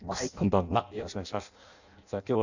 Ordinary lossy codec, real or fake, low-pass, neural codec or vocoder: none; fake; 7.2 kHz; codec, 16 kHz in and 24 kHz out, 0.6 kbps, FireRedTTS-2 codec